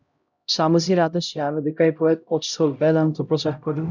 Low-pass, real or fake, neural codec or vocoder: 7.2 kHz; fake; codec, 16 kHz, 0.5 kbps, X-Codec, HuBERT features, trained on LibriSpeech